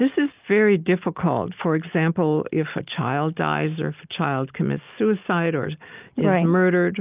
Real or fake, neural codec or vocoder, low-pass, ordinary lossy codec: real; none; 3.6 kHz; Opus, 24 kbps